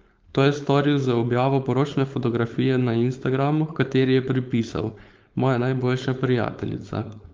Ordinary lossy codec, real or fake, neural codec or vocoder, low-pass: Opus, 24 kbps; fake; codec, 16 kHz, 4.8 kbps, FACodec; 7.2 kHz